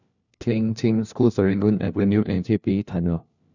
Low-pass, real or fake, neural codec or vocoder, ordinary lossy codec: 7.2 kHz; fake; codec, 16 kHz, 1 kbps, FunCodec, trained on LibriTTS, 50 frames a second; none